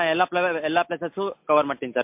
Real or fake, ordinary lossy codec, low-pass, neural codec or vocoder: real; MP3, 32 kbps; 3.6 kHz; none